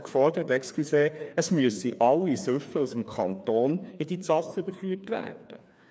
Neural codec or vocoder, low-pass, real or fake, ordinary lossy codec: codec, 16 kHz, 2 kbps, FreqCodec, larger model; none; fake; none